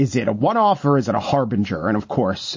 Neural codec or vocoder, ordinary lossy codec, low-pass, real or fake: none; MP3, 32 kbps; 7.2 kHz; real